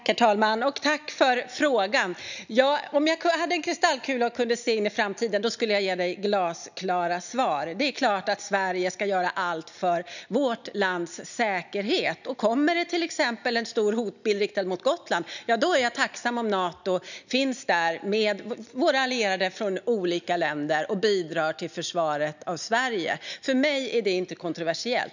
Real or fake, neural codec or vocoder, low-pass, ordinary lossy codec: real; none; 7.2 kHz; none